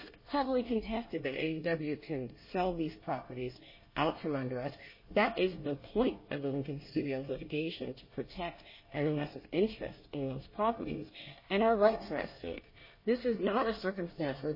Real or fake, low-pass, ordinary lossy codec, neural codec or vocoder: fake; 5.4 kHz; MP3, 24 kbps; codec, 24 kHz, 1 kbps, SNAC